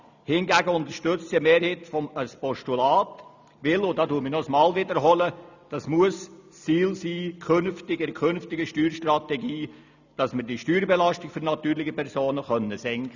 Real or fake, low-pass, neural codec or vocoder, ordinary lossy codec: real; 7.2 kHz; none; none